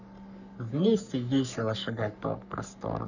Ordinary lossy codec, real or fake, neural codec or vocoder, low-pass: none; fake; codec, 44.1 kHz, 3.4 kbps, Pupu-Codec; 7.2 kHz